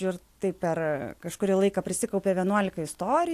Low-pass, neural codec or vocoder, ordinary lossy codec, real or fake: 14.4 kHz; none; AAC, 64 kbps; real